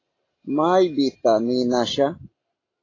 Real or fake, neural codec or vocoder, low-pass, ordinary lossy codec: real; none; 7.2 kHz; AAC, 32 kbps